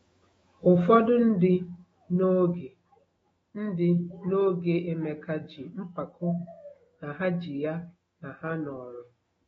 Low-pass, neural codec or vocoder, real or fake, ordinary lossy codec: 19.8 kHz; autoencoder, 48 kHz, 128 numbers a frame, DAC-VAE, trained on Japanese speech; fake; AAC, 24 kbps